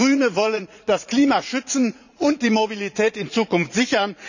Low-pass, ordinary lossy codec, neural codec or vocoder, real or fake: 7.2 kHz; none; vocoder, 44.1 kHz, 80 mel bands, Vocos; fake